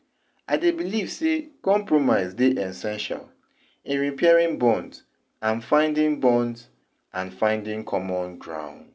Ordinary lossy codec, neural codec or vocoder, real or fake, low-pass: none; none; real; none